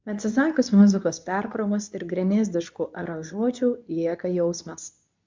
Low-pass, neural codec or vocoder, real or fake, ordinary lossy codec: 7.2 kHz; codec, 24 kHz, 0.9 kbps, WavTokenizer, medium speech release version 2; fake; MP3, 64 kbps